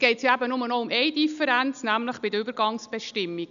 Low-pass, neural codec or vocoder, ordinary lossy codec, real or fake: 7.2 kHz; none; none; real